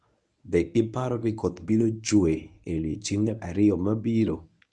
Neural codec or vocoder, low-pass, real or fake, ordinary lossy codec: codec, 24 kHz, 0.9 kbps, WavTokenizer, medium speech release version 1; 10.8 kHz; fake; none